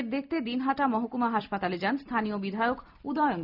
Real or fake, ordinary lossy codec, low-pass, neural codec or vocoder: real; none; 5.4 kHz; none